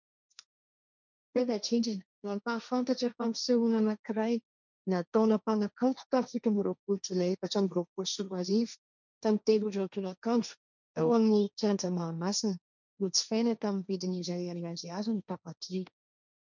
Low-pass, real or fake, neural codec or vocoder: 7.2 kHz; fake; codec, 16 kHz, 1.1 kbps, Voila-Tokenizer